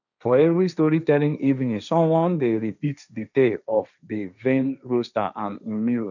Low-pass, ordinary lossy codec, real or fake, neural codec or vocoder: 7.2 kHz; none; fake; codec, 16 kHz, 1.1 kbps, Voila-Tokenizer